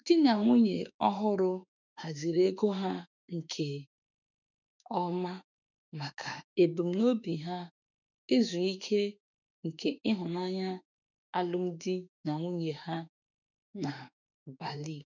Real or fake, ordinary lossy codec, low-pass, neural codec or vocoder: fake; none; 7.2 kHz; autoencoder, 48 kHz, 32 numbers a frame, DAC-VAE, trained on Japanese speech